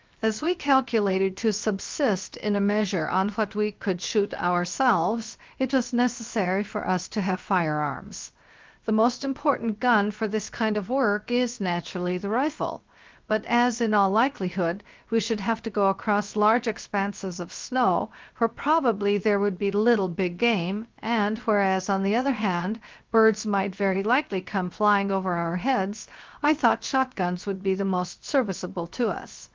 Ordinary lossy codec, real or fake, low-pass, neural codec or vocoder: Opus, 32 kbps; fake; 7.2 kHz; codec, 16 kHz, 0.7 kbps, FocalCodec